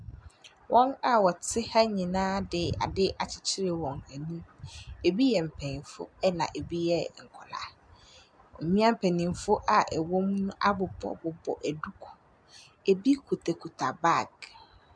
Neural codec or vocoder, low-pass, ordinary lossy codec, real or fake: none; 9.9 kHz; MP3, 96 kbps; real